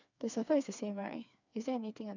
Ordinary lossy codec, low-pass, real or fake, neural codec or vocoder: none; 7.2 kHz; fake; codec, 16 kHz, 4 kbps, FreqCodec, smaller model